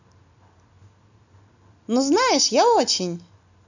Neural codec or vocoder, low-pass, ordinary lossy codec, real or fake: none; 7.2 kHz; none; real